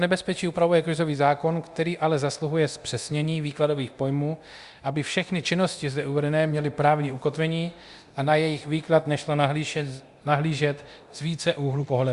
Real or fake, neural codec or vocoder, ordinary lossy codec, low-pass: fake; codec, 24 kHz, 0.9 kbps, DualCodec; Opus, 64 kbps; 10.8 kHz